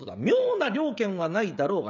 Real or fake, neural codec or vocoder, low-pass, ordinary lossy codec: fake; codec, 16 kHz, 16 kbps, FreqCodec, smaller model; 7.2 kHz; none